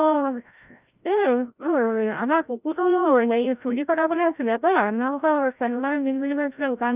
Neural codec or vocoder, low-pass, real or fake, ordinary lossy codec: codec, 16 kHz, 0.5 kbps, FreqCodec, larger model; 3.6 kHz; fake; none